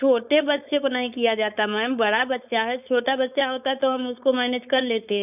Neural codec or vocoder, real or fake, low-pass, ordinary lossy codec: codec, 16 kHz, 4.8 kbps, FACodec; fake; 3.6 kHz; none